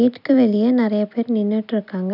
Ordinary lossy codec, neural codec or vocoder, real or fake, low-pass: none; none; real; 5.4 kHz